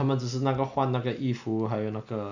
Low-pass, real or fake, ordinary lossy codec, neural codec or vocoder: 7.2 kHz; real; none; none